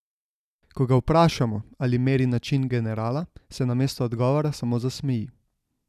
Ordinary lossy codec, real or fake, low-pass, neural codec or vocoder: none; real; 14.4 kHz; none